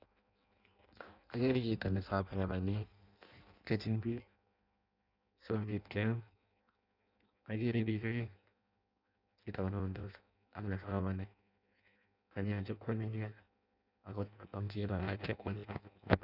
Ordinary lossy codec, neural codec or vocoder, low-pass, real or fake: none; codec, 16 kHz in and 24 kHz out, 0.6 kbps, FireRedTTS-2 codec; 5.4 kHz; fake